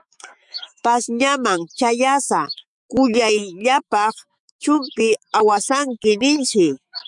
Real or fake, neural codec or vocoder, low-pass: fake; autoencoder, 48 kHz, 128 numbers a frame, DAC-VAE, trained on Japanese speech; 10.8 kHz